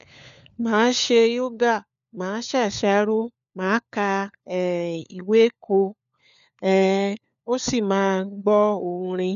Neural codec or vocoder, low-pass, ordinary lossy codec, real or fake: codec, 16 kHz, 4 kbps, FunCodec, trained on LibriTTS, 50 frames a second; 7.2 kHz; none; fake